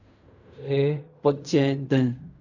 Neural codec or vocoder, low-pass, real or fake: codec, 16 kHz in and 24 kHz out, 0.4 kbps, LongCat-Audio-Codec, fine tuned four codebook decoder; 7.2 kHz; fake